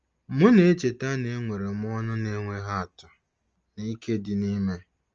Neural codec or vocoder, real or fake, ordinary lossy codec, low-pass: none; real; Opus, 24 kbps; 7.2 kHz